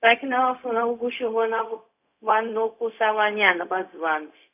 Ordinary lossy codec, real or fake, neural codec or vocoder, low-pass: none; fake; codec, 16 kHz, 0.4 kbps, LongCat-Audio-Codec; 3.6 kHz